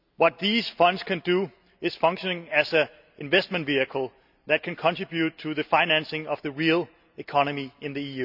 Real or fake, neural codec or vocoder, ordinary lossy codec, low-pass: real; none; none; 5.4 kHz